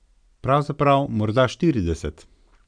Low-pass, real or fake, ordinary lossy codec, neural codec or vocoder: 9.9 kHz; real; none; none